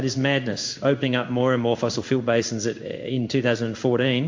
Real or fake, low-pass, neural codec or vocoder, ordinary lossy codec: fake; 7.2 kHz; codec, 16 kHz in and 24 kHz out, 1 kbps, XY-Tokenizer; MP3, 48 kbps